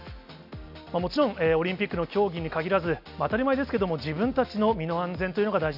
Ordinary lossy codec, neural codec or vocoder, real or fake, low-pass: none; none; real; 5.4 kHz